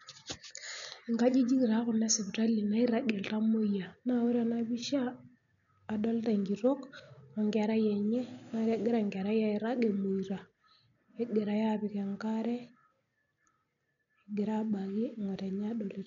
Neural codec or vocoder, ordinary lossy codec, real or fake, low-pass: none; none; real; 7.2 kHz